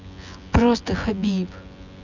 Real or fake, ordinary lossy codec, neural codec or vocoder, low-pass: fake; none; vocoder, 24 kHz, 100 mel bands, Vocos; 7.2 kHz